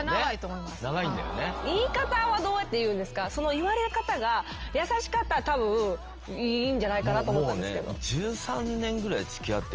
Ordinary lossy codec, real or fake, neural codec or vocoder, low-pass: Opus, 24 kbps; real; none; 7.2 kHz